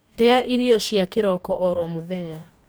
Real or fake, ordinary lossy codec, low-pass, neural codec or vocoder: fake; none; none; codec, 44.1 kHz, 2.6 kbps, DAC